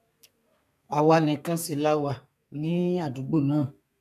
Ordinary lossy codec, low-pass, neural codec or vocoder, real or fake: none; 14.4 kHz; codec, 32 kHz, 1.9 kbps, SNAC; fake